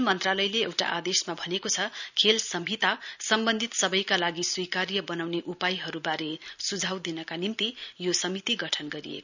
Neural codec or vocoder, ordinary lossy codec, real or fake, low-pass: none; none; real; 7.2 kHz